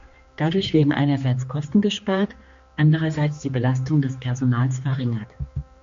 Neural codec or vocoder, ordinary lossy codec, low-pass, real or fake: codec, 16 kHz, 2 kbps, X-Codec, HuBERT features, trained on general audio; MP3, 48 kbps; 7.2 kHz; fake